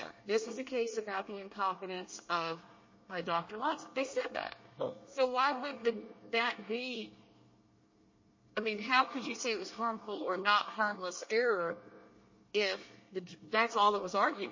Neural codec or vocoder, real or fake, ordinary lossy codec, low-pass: codec, 24 kHz, 1 kbps, SNAC; fake; MP3, 32 kbps; 7.2 kHz